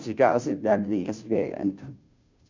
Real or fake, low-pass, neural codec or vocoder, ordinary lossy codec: fake; 7.2 kHz; codec, 16 kHz, 0.5 kbps, FunCodec, trained on Chinese and English, 25 frames a second; MP3, 64 kbps